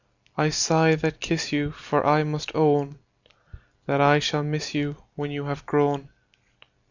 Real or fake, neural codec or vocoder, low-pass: real; none; 7.2 kHz